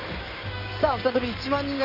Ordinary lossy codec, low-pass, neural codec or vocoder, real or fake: none; 5.4 kHz; codec, 16 kHz in and 24 kHz out, 2.2 kbps, FireRedTTS-2 codec; fake